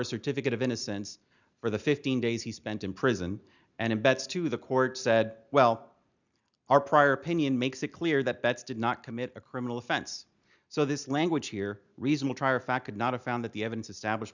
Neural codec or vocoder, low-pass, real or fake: none; 7.2 kHz; real